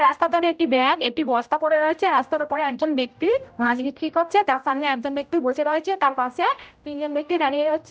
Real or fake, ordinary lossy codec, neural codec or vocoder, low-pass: fake; none; codec, 16 kHz, 0.5 kbps, X-Codec, HuBERT features, trained on general audio; none